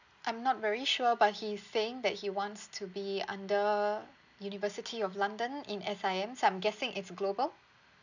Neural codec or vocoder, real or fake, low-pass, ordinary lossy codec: none; real; 7.2 kHz; none